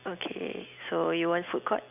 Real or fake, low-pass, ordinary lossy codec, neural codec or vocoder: real; 3.6 kHz; none; none